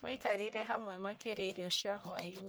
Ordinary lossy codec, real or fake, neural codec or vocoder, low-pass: none; fake; codec, 44.1 kHz, 1.7 kbps, Pupu-Codec; none